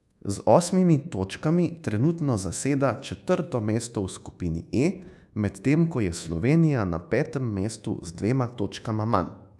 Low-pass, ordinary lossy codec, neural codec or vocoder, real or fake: none; none; codec, 24 kHz, 1.2 kbps, DualCodec; fake